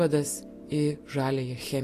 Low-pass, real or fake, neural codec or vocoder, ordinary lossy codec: 14.4 kHz; real; none; AAC, 48 kbps